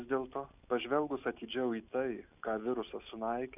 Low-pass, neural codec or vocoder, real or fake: 3.6 kHz; none; real